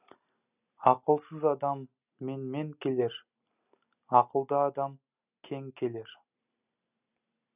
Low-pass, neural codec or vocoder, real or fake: 3.6 kHz; none; real